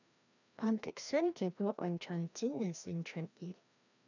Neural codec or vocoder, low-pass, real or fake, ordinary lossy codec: codec, 16 kHz, 1 kbps, FreqCodec, larger model; 7.2 kHz; fake; none